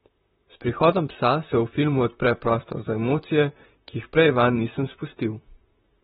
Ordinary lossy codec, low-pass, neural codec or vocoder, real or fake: AAC, 16 kbps; 19.8 kHz; vocoder, 44.1 kHz, 128 mel bands, Pupu-Vocoder; fake